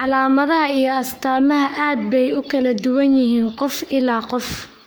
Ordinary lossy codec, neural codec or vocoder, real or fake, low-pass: none; codec, 44.1 kHz, 3.4 kbps, Pupu-Codec; fake; none